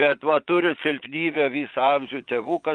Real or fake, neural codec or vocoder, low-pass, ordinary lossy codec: fake; vocoder, 22.05 kHz, 80 mel bands, Vocos; 9.9 kHz; Opus, 32 kbps